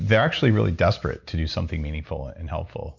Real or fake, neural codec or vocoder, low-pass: real; none; 7.2 kHz